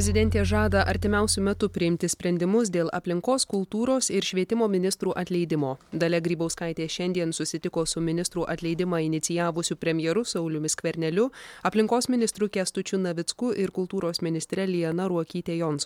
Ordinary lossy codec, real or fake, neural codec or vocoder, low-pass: MP3, 96 kbps; real; none; 19.8 kHz